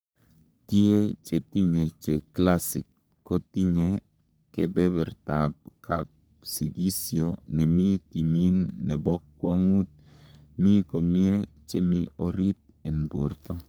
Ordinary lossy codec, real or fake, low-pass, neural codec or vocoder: none; fake; none; codec, 44.1 kHz, 3.4 kbps, Pupu-Codec